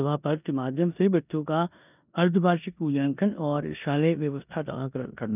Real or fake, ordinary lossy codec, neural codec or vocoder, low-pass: fake; none; codec, 16 kHz in and 24 kHz out, 0.9 kbps, LongCat-Audio-Codec, four codebook decoder; 3.6 kHz